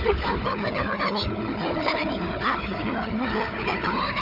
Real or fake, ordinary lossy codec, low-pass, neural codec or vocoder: fake; none; 5.4 kHz; codec, 16 kHz, 4 kbps, FunCodec, trained on Chinese and English, 50 frames a second